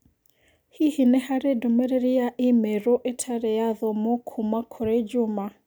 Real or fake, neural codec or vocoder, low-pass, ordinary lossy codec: real; none; none; none